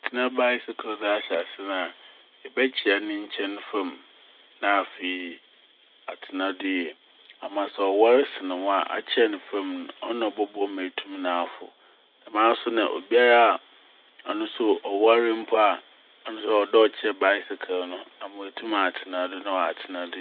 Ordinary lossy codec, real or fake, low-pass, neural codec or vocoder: none; real; 5.4 kHz; none